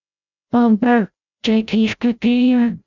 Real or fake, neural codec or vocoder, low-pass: fake; codec, 16 kHz, 0.5 kbps, FreqCodec, larger model; 7.2 kHz